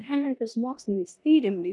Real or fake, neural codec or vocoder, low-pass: fake; codec, 16 kHz in and 24 kHz out, 0.9 kbps, LongCat-Audio-Codec, four codebook decoder; 10.8 kHz